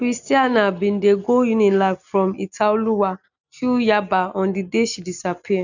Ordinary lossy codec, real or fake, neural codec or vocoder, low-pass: none; real; none; 7.2 kHz